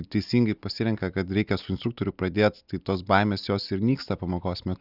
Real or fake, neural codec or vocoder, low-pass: real; none; 5.4 kHz